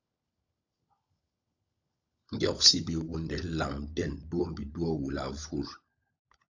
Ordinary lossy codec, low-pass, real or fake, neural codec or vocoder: AAC, 48 kbps; 7.2 kHz; fake; codec, 16 kHz, 16 kbps, FunCodec, trained on LibriTTS, 50 frames a second